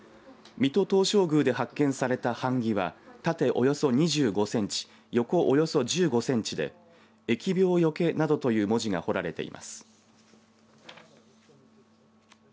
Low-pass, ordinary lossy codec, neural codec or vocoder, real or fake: none; none; none; real